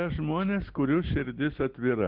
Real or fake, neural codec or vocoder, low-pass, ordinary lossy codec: real; none; 5.4 kHz; Opus, 16 kbps